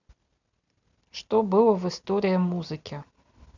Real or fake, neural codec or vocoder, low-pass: real; none; 7.2 kHz